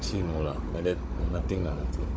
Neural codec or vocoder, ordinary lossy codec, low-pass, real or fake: codec, 16 kHz, 16 kbps, FunCodec, trained on Chinese and English, 50 frames a second; none; none; fake